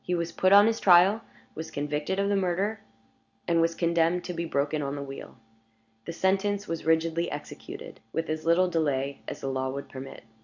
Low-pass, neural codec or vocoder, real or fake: 7.2 kHz; none; real